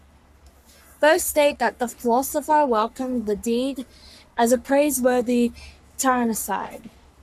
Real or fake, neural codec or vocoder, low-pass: fake; codec, 44.1 kHz, 3.4 kbps, Pupu-Codec; 14.4 kHz